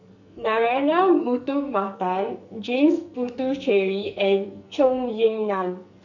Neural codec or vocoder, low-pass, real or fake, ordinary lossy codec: codec, 44.1 kHz, 2.6 kbps, SNAC; 7.2 kHz; fake; none